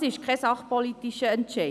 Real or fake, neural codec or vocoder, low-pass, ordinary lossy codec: real; none; none; none